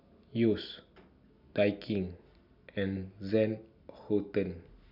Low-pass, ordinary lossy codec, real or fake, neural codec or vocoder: 5.4 kHz; none; real; none